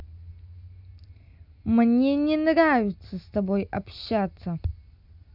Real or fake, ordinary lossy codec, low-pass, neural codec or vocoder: real; none; 5.4 kHz; none